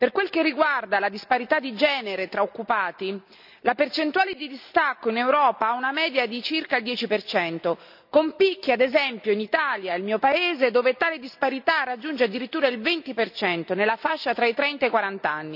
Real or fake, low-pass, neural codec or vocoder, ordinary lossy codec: real; 5.4 kHz; none; none